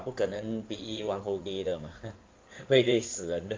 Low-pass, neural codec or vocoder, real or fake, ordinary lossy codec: 7.2 kHz; codec, 16 kHz in and 24 kHz out, 2.2 kbps, FireRedTTS-2 codec; fake; Opus, 32 kbps